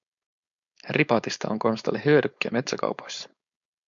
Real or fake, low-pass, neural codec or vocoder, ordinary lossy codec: fake; 7.2 kHz; codec, 16 kHz, 4.8 kbps, FACodec; MP3, 64 kbps